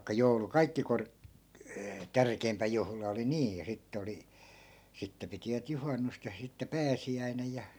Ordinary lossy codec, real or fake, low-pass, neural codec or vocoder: none; real; none; none